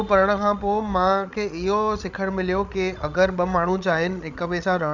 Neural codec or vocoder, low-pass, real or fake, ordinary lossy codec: codec, 16 kHz, 16 kbps, FreqCodec, larger model; 7.2 kHz; fake; none